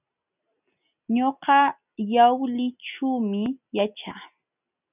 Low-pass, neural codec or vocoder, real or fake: 3.6 kHz; none; real